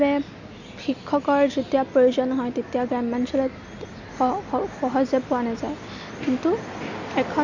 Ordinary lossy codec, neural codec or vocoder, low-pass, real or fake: none; none; 7.2 kHz; real